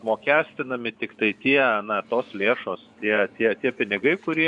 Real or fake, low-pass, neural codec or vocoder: fake; 10.8 kHz; vocoder, 24 kHz, 100 mel bands, Vocos